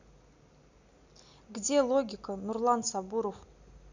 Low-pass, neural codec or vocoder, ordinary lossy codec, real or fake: 7.2 kHz; none; none; real